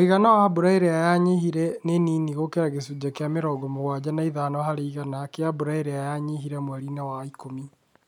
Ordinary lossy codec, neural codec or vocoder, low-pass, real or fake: none; none; 19.8 kHz; real